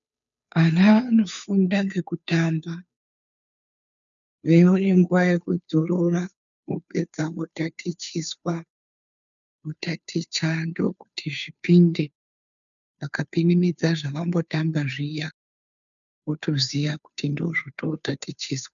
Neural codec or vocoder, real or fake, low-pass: codec, 16 kHz, 2 kbps, FunCodec, trained on Chinese and English, 25 frames a second; fake; 7.2 kHz